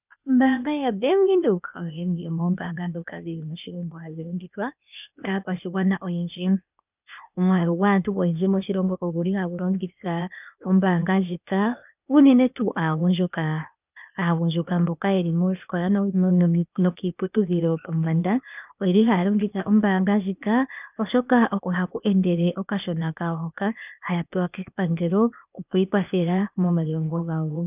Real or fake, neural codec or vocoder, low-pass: fake; codec, 16 kHz, 0.8 kbps, ZipCodec; 3.6 kHz